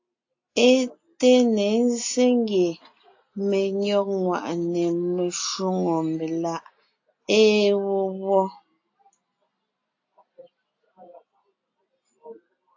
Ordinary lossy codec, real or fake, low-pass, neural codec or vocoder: AAC, 48 kbps; real; 7.2 kHz; none